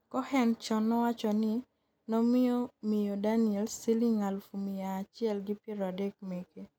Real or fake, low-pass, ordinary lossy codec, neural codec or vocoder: real; 19.8 kHz; none; none